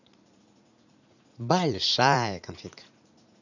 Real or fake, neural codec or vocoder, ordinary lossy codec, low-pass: fake; vocoder, 44.1 kHz, 80 mel bands, Vocos; none; 7.2 kHz